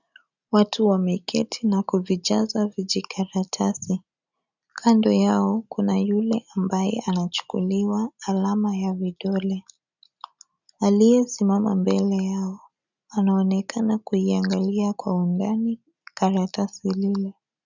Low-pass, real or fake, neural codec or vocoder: 7.2 kHz; real; none